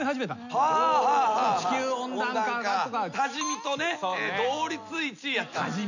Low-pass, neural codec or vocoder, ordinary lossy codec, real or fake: 7.2 kHz; none; none; real